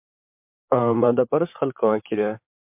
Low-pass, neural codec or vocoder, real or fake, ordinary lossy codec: 3.6 kHz; vocoder, 44.1 kHz, 128 mel bands, Pupu-Vocoder; fake; MP3, 32 kbps